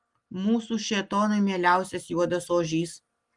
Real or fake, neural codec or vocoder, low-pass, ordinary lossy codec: real; none; 10.8 kHz; Opus, 24 kbps